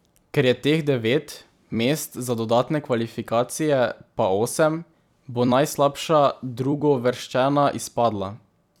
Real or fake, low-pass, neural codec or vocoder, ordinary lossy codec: fake; 19.8 kHz; vocoder, 44.1 kHz, 128 mel bands every 256 samples, BigVGAN v2; none